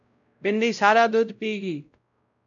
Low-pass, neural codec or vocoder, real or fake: 7.2 kHz; codec, 16 kHz, 0.5 kbps, X-Codec, WavLM features, trained on Multilingual LibriSpeech; fake